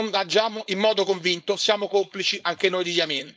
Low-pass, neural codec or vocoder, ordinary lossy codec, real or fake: none; codec, 16 kHz, 4.8 kbps, FACodec; none; fake